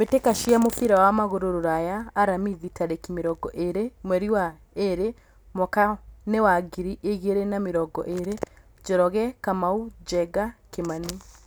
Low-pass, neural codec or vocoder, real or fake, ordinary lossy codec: none; none; real; none